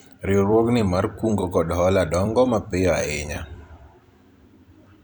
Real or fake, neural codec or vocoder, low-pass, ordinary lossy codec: fake; vocoder, 44.1 kHz, 128 mel bands every 256 samples, BigVGAN v2; none; none